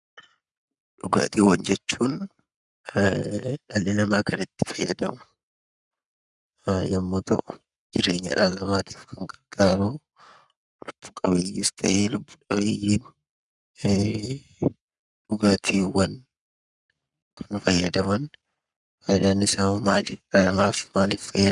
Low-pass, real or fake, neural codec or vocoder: 10.8 kHz; fake; codec, 44.1 kHz, 7.8 kbps, Pupu-Codec